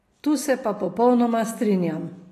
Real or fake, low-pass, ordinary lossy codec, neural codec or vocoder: real; 14.4 kHz; AAC, 48 kbps; none